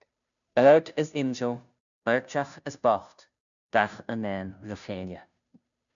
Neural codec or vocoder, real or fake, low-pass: codec, 16 kHz, 0.5 kbps, FunCodec, trained on Chinese and English, 25 frames a second; fake; 7.2 kHz